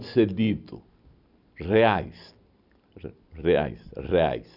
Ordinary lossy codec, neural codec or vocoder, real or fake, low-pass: none; none; real; 5.4 kHz